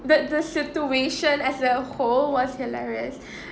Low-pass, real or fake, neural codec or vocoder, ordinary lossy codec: none; real; none; none